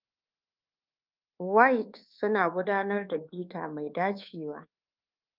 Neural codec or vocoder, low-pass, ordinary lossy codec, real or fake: codec, 24 kHz, 1.2 kbps, DualCodec; 5.4 kHz; Opus, 24 kbps; fake